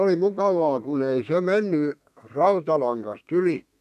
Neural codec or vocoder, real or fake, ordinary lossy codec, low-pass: codec, 32 kHz, 1.9 kbps, SNAC; fake; none; 14.4 kHz